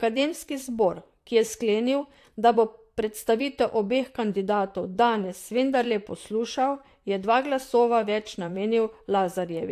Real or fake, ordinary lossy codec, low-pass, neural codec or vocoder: fake; AAC, 64 kbps; 14.4 kHz; vocoder, 44.1 kHz, 128 mel bands, Pupu-Vocoder